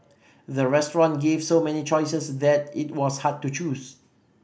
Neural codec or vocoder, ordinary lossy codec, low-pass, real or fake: none; none; none; real